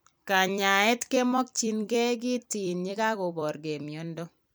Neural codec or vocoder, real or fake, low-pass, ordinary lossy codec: vocoder, 44.1 kHz, 128 mel bands every 256 samples, BigVGAN v2; fake; none; none